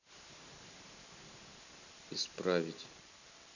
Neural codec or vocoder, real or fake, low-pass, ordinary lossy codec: none; real; 7.2 kHz; none